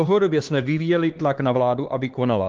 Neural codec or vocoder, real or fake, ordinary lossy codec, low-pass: codec, 16 kHz, 2 kbps, X-Codec, HuBERT features, trained on LibriSpeech; fake; Opus, 16 kbps; 7.2 kHz